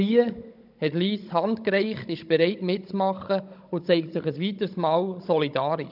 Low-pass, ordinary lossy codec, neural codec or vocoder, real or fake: 5.4 kHz; none; codec, 16 kHz, 16 kbps, FunCodec, trained on Chinese and English, 50 frames a second; fake